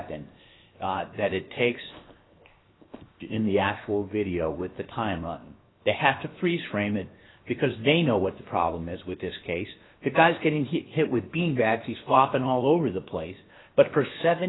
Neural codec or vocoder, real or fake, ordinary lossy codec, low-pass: codec, 16 kHz, 0.7 kbps, FocalCodec; fake; AAC, 16 kbps; 7.2 kHz